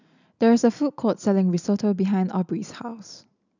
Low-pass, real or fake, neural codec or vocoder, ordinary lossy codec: 7.2 kHz; real; none; none